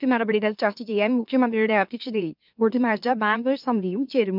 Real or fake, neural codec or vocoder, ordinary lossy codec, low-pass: fake; autoencoder, 44.1 kHz, a latent of 192 numbers a frame, MeloTTS; none; 5.4 kHz